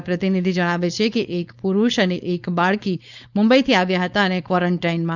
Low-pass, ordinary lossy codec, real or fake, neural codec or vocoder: 7.2 kHz; none; fake; codec, 16 kHz, 4.8 kbps, FACodec